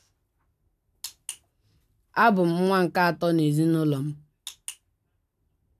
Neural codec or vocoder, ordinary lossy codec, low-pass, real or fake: none; none; 14.4 kHz; real